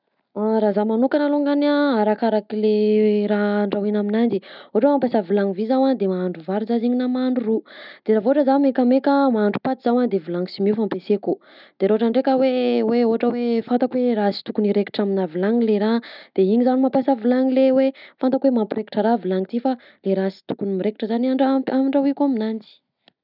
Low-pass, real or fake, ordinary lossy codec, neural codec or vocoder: 5.4 kHz; real; none; none